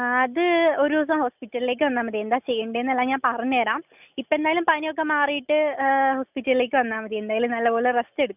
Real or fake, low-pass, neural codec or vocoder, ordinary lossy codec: real; 3.6 kHz; none; none